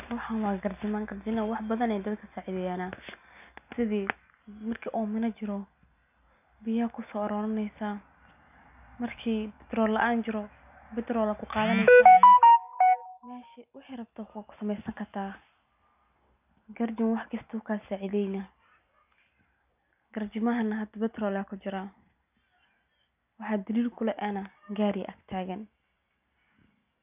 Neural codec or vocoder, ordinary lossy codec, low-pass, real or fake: none; none; 3.6 kHz; real